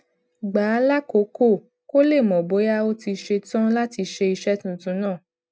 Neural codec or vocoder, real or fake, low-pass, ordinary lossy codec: none; real; none; none